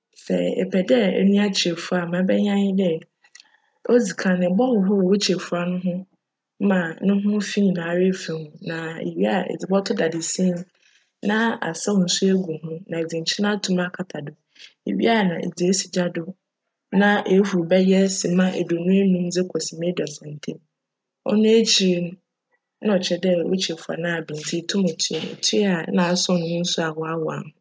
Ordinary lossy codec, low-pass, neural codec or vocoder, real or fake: none; none; none; real